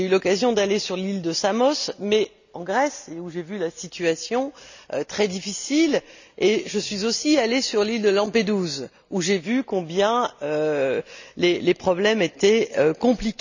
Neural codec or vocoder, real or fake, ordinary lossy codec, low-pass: none; real; none; 7.2 kHz